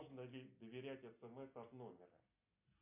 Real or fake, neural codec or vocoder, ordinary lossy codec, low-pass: real; none; MP3, 32 kbps; 3.6 kHz